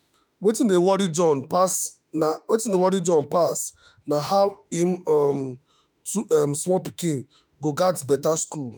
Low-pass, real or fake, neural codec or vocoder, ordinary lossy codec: none; fake; autoencoder, 48 kHz, 32 numbers a frame, DAC-VAE, trained on Japanese speech; none